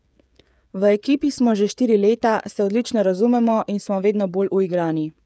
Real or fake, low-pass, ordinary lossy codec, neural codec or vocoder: fake; none; none; codec, 16 kHz, 16 kbps, FreqCodec, smaller model